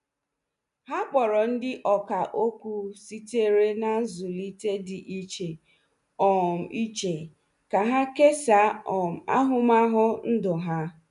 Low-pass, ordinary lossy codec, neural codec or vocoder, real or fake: 10.8 kHz; none; none; real